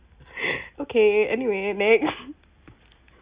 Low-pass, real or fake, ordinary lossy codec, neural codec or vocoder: 3.6 kHz; real; Opus, 64 kbps; none